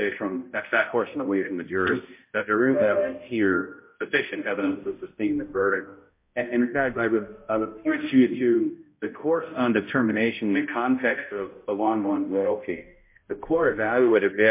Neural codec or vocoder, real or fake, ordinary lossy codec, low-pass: codec, 16 kHz, 0.5 kbps, X-Codec, HuBERT features, trained on balanced general audio; fake; MP3, 24 kbps; 3.6 kHz